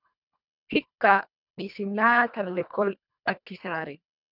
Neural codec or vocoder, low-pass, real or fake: codec, 24 kHz, 1.5 kbps, HILCodec; 5.4 kHz; fake